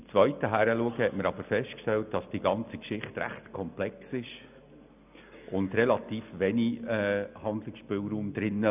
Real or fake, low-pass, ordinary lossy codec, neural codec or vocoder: real; 3.6 kHz; none; none